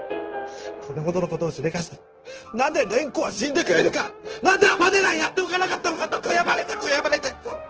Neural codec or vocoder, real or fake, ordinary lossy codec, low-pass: codec, 16 kHz, 0.9 kbps, LongCat-Audio-Codec; fake; Opus, 16 kbps; 7.2 kHz